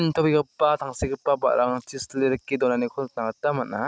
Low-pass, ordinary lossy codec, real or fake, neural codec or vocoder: none; none; real; none